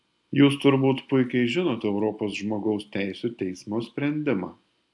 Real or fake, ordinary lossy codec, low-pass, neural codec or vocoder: real; AAC, 64 kbps; 10.8 kHz; none